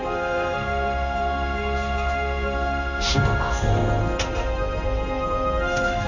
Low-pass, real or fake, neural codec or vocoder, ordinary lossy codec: 7.2 kHz; fake; codec, 16 kHz in and 24 kHz out, 1 kbps, XY-Tokenizer; none